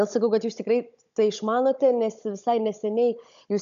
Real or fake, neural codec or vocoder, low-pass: fake; codec, 16 kHz, 16 kbps, FunCodec, trained on Chinese and English, 50 frames a second; 7.2 kHz